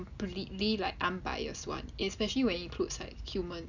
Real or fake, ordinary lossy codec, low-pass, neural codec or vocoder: real; none; 7.2 kHz; none